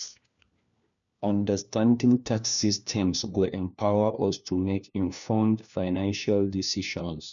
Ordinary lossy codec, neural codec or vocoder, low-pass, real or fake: none; codec, 16 kHz, 1 kbps, FunCodec, trained on LibriTTS, 50 frames a second; 7.2 kHz; fake